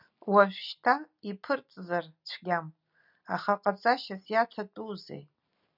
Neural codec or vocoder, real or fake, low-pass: none; real; 5.4 kHz